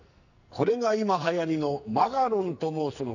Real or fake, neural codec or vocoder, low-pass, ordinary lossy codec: fake; codec, 44.1 kHz, 2.6 kbps, SNAC; 7.2 kHz; none